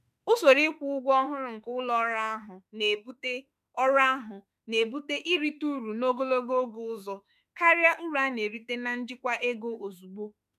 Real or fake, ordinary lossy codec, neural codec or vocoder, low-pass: fake; none; autoencoder, 48 kHz, 32 numbers a frame, DAC-VAE, trained on Japanese speech; 14.4 kHz